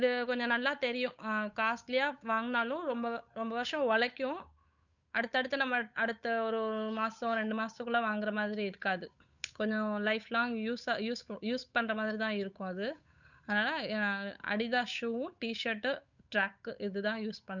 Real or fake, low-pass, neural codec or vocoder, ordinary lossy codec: fake; 7.2 kHz; codec, 16 kHz, 8 kbps, FunCodec, trained on Chinese and English, 25 frames a second; none